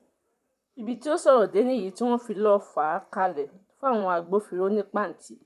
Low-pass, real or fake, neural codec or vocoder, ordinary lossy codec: 14.4 kHz; fake; vocoder, 44.1 kHz, 128 mel bands, Pupu-Vocoder; none